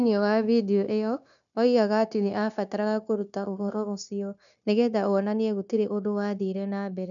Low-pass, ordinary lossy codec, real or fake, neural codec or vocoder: 7.2 kHz; none; fake; codec, 16 kHz, 0.9 kbps, LongCat-Audio-Codec